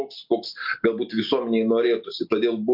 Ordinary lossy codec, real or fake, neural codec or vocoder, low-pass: MP3, 48 kbps; real; none; 5.4 kHz